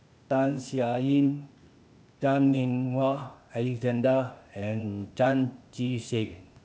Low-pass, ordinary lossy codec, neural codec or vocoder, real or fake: none; none; codec, 16 kHz, 0.8 kbps, ZipCodec; fake